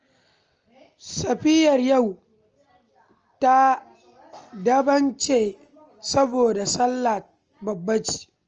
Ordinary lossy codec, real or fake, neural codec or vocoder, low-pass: Opus, 24 kbps; real; none; 7.2 kHz